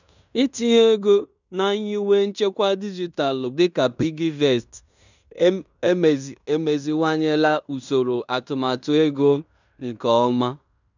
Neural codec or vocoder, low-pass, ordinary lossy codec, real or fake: codec, 16 kHz in and 24 kHz out, 0.9 kbps, LongCat-Audio-Codec, fine tuned four codebook decoder; 7.2 kHz; none; fake